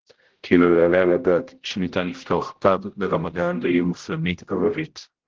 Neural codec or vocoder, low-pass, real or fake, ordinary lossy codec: codec, 16 kHz, 0.5 kbps, X-Codec, HuBERT features, trained on general audio; 7.2 kHz; fake; Opus, 16 kbps